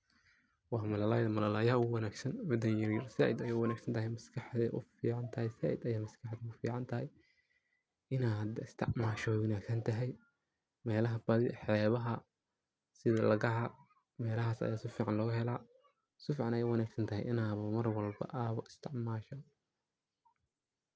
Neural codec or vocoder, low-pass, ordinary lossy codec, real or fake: none; none; none; real